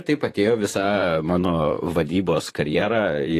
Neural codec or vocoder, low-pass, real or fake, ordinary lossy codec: vocoder, 44.1 kHz, 128 mel bands, Pupu-Vocoder; 14.4 kHz; fake; AAC, 48 kbps